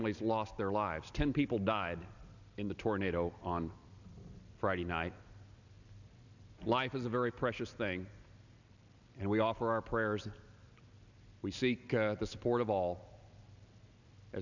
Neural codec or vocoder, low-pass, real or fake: none; 7.2 kHz; real